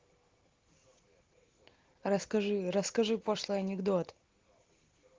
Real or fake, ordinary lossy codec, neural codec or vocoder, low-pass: real; Opus, 16 kbps; none; 7.2 kHz